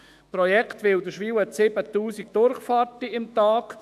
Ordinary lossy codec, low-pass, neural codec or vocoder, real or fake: none; 14.4 kHz; autoencoder, 48 kHz, 128 numbers a frame, DAC-VAE, trained on Japanese speech; fake